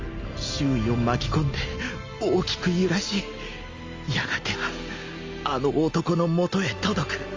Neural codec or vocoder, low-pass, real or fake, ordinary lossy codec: none; 7.2 kHz; real; Opus, 32 kbps